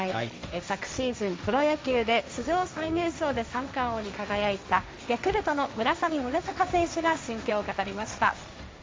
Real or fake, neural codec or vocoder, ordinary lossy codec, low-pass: fake; codec, 16 kHz, 1.1 kbps, Voila-Tokenizer; none; none